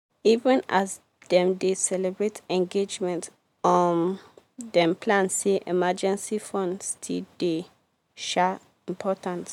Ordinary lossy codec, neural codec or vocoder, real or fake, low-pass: MP3, 96 kbps; none; real; 19.8 kHz